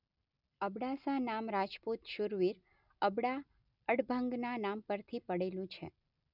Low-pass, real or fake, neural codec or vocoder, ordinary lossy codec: 5.4 kHz; real; none; none